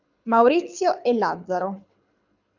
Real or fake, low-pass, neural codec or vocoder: fake; 7.2 kHz; codec, 24 kHz, 6 kbps, HILCodec